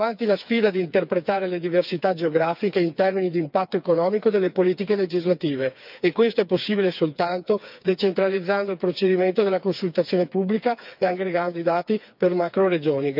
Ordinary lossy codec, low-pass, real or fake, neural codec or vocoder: none; 5.4 kHz; fake; codec, 16 kHz, 4 kbps, FreqCodec, smaller model